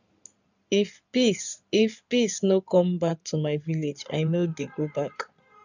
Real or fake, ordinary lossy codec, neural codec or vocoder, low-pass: fake; none; codec, 16 kHz in and 24 kHz out, 2.2 kbps, FireRedTTS-2 codec; 7.2 kHz